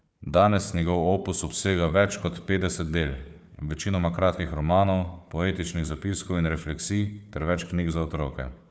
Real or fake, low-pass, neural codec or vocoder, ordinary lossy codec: fake; none; codec, 16 kHz, 4 kbps, FunCodec, trained on Chinese and English, 50 frames a second; none